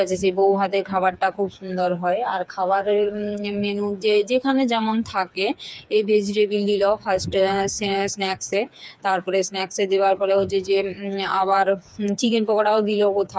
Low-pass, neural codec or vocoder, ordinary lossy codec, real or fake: none; codec, 16 kHz, 4 kbps, FreqCodec, smaller model; none; fake